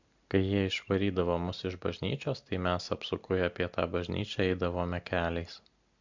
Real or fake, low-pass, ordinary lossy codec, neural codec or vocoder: real; 7.2 kHz; MP3, 64 kbps; none